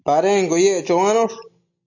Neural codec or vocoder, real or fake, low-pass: none; real; 7.2 kHz